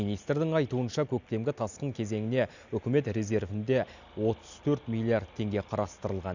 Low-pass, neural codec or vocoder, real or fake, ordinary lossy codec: 7.2 kHz; none; real; none